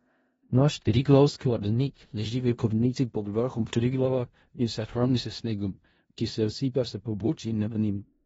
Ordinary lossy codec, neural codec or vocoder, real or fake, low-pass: AAC, 24 kbps; codec, 16 kHz in and 24 kHz out, 0.4 kbps, LongCat-Audio-Codec, four codebook decoder; fake; 10.8 kHz